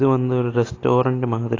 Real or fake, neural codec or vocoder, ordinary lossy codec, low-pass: real; none; AAC, 32 kbps; 7.2 kHz